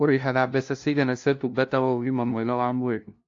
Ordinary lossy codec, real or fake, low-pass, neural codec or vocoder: AAC, 48 kbps; fake; 7.2 kHz; codec, 16 kHz, 0.5 kbps, FunCodec, trained on LibriTTS, 25 frames a second